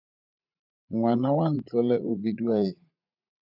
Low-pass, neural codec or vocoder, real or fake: 5.4 kHz; vocoder, 44.1 kHz, 80 mel bands, Vocos; fake